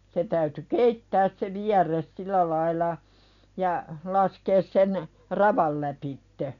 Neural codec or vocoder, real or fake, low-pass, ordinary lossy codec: none; real; 7.2 kHz; none